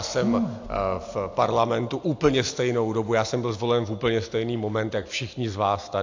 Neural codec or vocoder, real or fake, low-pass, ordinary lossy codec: none; real; 7.2 kHz; AAC, 48 kbps